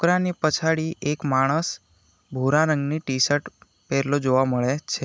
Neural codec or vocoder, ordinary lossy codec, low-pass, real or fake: none; none; none; real